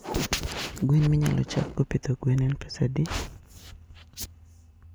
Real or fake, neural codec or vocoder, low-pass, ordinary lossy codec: real; none; none; none